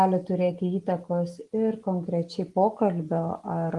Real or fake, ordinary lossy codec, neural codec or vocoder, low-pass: real; Opus, 24 kbps; none; 10.8 kHz